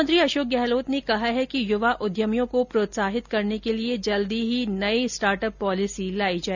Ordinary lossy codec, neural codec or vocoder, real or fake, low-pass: none; none; real; 7.2 kHz